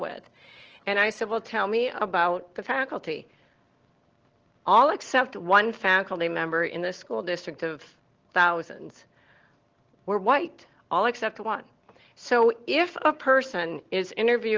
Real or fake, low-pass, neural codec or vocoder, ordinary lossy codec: real; 7.2 kHz; none; Opus, 16 kbps